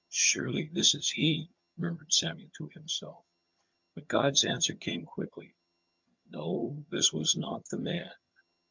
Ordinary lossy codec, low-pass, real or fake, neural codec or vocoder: MP3, 64 kbps; 7.2 kHz; fake; vocoder, 22.05 kHz, 80 mel bands, HiFi-GAN